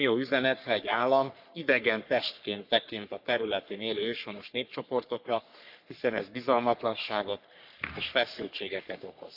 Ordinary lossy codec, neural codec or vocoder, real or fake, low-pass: none; codec, 44.1 kHz, 3.4 kbps, Pupu-Codec; fake; 5.4 kHz